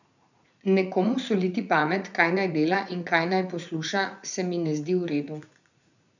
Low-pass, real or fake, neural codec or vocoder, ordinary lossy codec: 7.2 kHz; fake; vocoder, 44.1 kHz, 80 mel bands, Vocos; none